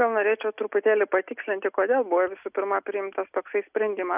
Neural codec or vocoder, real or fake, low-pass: none; real; 3.6 kHz